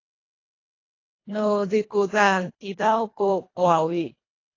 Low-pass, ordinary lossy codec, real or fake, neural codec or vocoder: 7.2 kHz; AAC, 32 kbps; fake; codec, 24 kHz, 1.5 kbps, HILCodec